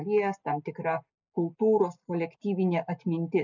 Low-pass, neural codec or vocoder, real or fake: 7.2 kHz; none; real